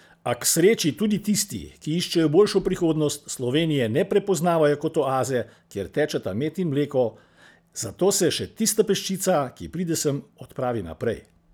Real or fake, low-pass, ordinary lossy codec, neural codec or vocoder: real; none; none; none